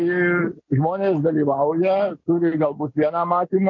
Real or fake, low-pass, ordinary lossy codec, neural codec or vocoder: real; 7.2 kHz; MP3, 48 kbps; none